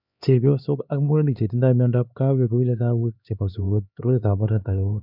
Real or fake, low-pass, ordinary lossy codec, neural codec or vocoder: fake; 5.4 kHz; none; codec, 16 kHz, 2 kbps, X-Codec, HuBERT features, trained on LibriSpeech